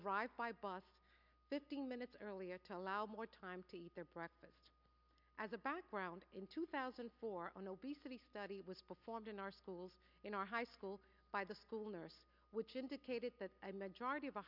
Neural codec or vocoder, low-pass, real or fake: none; 5.4 kHz; real